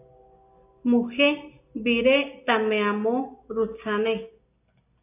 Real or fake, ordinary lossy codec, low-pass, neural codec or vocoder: real; AAC, 24 kbps; 3.6 kHz; none